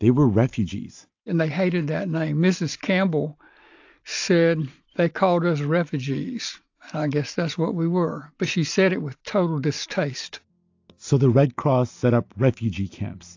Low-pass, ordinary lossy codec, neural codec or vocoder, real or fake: 7.2 kHz; AAC, 48 kbps; none; real